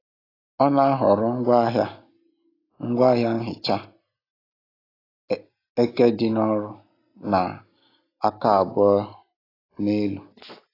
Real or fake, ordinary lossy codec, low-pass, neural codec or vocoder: real; AAC, 24 kbps; 5.4 kHz; none